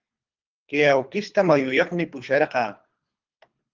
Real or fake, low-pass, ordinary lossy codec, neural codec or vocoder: fake; 7.2 kHz; Opus, 24 kbps; codec, 24 kHz, 3 kbps, HILCodec